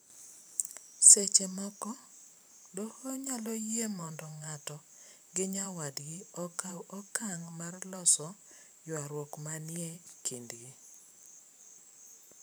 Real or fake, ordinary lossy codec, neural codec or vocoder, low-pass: fake; none; vocoder, 44.1 kHz, 128 mel bands every 512 samples, BigVGAN v2; none